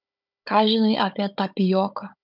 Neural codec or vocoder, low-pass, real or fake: codec, 16 kHz, 16 kbps, FunCodec, trained on Chinese and English, 50 frames a second; 5.4 kHz; fake